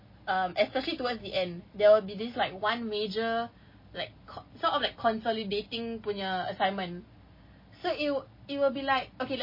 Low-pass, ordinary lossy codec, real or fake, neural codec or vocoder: 5.4 kHz; MP3, 24 kbps; real; none